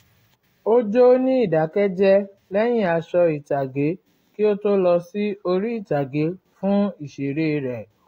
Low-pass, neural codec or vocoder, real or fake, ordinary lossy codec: 19.8 kHz; none; real; AAC, 48 kbps